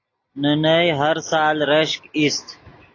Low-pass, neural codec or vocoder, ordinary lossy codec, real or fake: 7.2 kHz; none; AAC, 32 kbps; real